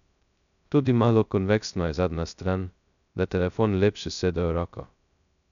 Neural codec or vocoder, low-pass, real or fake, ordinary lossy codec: codec, 16 kHz, 0.2 kbps, FocalCodec; 7.2 kHz; fake; none